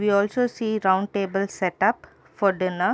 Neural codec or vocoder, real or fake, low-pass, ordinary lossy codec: none; real; none; none